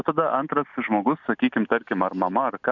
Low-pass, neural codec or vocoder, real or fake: 7.2 kHz; none; real